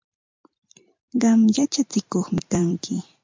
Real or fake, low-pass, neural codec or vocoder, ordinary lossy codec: fake; 7.2 kHz; vocoder, 44.1 kHz, 128 mel bands every 256 samples, BigVGAN v2; MP3, 64 kbps